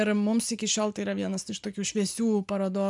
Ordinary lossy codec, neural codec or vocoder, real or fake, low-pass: MP3, 96 kbps; none; real; 10.8 kHz